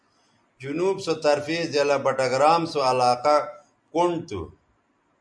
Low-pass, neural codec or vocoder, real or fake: 9.9 kHz; none; real